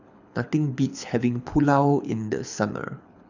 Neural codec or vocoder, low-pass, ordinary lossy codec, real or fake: codec, 24 kHz, 6 kbps, HILCodec; 7.2 kHz; none; fake